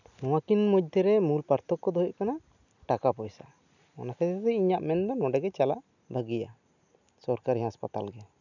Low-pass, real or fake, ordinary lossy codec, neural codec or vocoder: 7.2 kHz; real; none; none